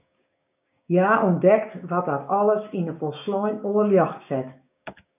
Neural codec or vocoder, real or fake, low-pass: codec, 16 kHz, 6 kbps, DAC; fake; 3.6 kHz